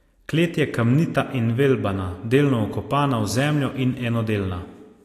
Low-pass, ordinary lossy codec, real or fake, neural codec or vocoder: 14.4 kHz; AAC, 48 kbps; real; none